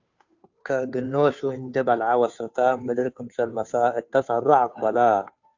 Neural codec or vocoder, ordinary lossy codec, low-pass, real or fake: codec, 16 kHz, 2 kbps, FunCodec, trained on Chinese and English, 25 frames a second; AAC, 48 kbps; 7.2 kHz; fake